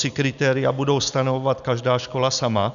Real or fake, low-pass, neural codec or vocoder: real; 7.2 kHz; none